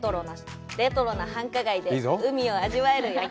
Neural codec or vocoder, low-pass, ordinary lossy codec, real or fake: none; none; none; real